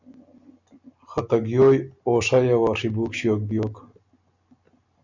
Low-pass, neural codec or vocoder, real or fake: 7.2 kHz; none; real